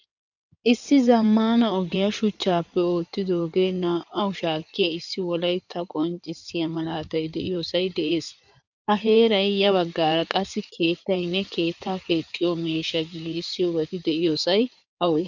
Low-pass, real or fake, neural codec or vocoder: 7.2 kHz; fake; codec, 16 kHz in and 24 kHz out, 2.2 kbps, FireRedTTS-2 codec